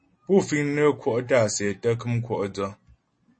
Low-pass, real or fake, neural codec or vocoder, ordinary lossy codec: 9.9 kHz; real; none; MP3, 32 kbps